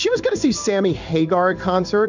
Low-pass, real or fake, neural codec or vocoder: 7.2 kHz; fake; codec, 16 kHz in and 24 kHz out, 1 kbps, XY-Tokenizer